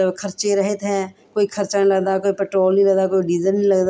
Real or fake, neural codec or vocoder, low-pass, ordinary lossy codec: real; none; none; none